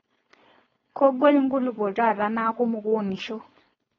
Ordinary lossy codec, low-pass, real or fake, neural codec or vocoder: AAC, 24 kbps; 7.2 kHz; fake; codec, 16 kHz, 4.8 kbps, FACodec